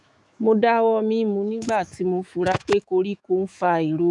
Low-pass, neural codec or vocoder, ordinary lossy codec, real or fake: 10.8 kHz; autoencoder, 48 kHz, 128 numbers a frame, DAC-VAE, trained on Japanese speech; none; fake